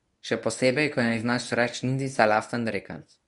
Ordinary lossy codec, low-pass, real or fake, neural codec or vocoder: none; 10.8 kHz; fake; codec, 24 kHz, 0.9 kbps, WavTokenizer, medium speech release version 1